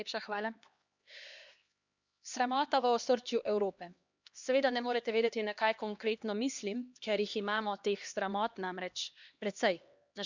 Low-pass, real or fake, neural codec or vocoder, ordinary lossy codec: 7.2 kHz; fake; codec, 16 kHz, 2 kbps, X-Codec, HuBERT features, trained on LibriSpeech; Opus, 64 kbps